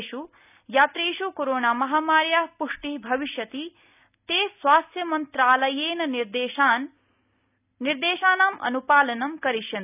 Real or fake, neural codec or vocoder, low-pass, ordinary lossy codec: real; none; 3.6 kHz; none